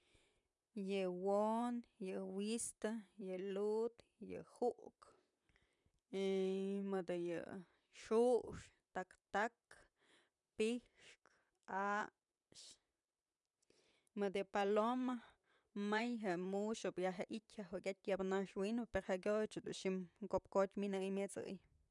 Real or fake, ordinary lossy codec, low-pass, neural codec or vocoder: fake; none; 10.8 kHz; vocoder, 44.1 kHz, 128 mel bands, Pupu-Vocoder